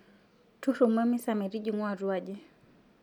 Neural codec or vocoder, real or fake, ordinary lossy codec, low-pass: none; real; none; 19.8 kHz